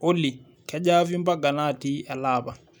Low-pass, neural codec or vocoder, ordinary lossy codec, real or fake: none; none; none; real